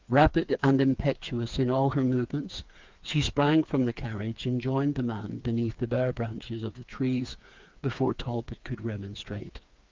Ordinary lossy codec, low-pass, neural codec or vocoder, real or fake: Opus, 24 kbps; 7.2 kHz; codec, 16 kHz, 4 kbps, FreqCodec, smaller model; fake